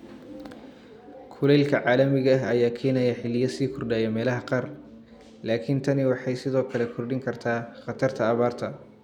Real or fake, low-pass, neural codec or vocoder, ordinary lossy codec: real; 19.8 kHz; none; none